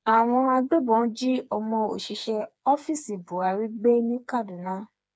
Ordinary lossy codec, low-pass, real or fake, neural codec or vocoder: none; none; fake; codec, 16 kHz, 4 kbps, FreqCodec, smaller model